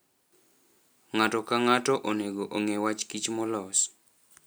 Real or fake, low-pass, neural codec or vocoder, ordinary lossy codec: real; none; none; none